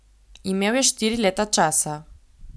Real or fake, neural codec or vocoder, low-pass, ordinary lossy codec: real; none; none; none